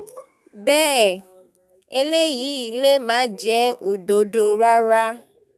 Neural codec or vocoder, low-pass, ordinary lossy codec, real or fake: codec, 32 kHz, 1.9 kbps, SNAC; 14.4 kHz; none; fake